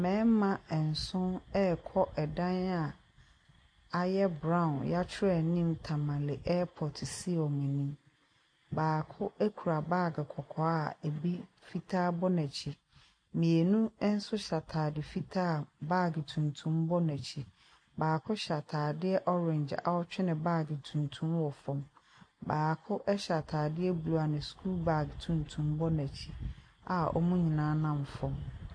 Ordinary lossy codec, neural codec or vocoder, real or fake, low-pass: MP3, 48 kbps; none; real; 9.9 kHz